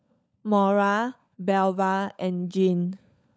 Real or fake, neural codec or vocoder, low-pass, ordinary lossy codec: fake; codec, 16 kHz, 16 kbps, FunCodec, trained on LibriTTS, 50 frames a second; none; none